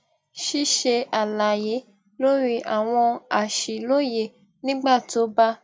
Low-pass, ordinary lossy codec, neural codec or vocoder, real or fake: none; none; none; real